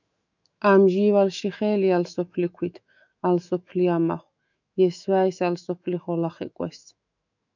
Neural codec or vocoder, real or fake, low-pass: autoencoder, 48 kHz, 128 numbers a frame, DAC-VAE, trained on Japanese speech; fake; 7.2 kHz